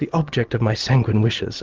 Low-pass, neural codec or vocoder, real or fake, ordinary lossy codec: 7.2 kHz; none; real; Opus, 16 kbps